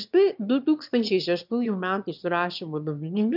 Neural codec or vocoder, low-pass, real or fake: autoencoder, 22.05 kHz, a latent of 192 numbers a frame, VITS, trained on one speaker; 5.4 kHz; fake